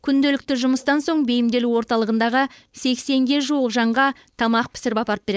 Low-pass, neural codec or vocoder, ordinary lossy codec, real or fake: none; codec, 16 kHz, 4.8 kbps, FACodec; none; fake